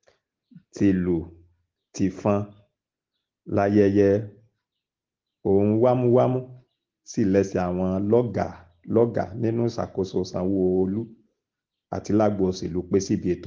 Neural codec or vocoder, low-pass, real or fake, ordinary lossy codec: none; 7.2 kHz; real; Opus, 16 kbps